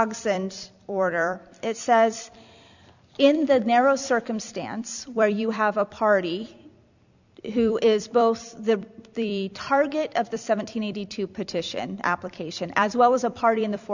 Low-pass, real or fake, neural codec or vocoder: 7.2 kHz; fake; vocoder, 44.1 kHz, 128 mel bands every 256 samples, BigVGAN v2